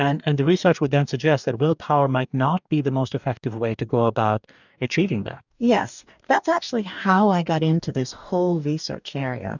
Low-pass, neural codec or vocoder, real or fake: 7.2 kHz; codec, 44.1 kHz, 2.6 kbps, DAC; fake